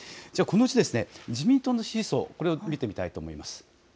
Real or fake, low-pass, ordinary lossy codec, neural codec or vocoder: real; none; none; none